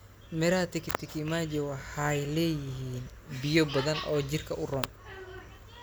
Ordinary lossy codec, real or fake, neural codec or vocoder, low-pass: none; real; none; none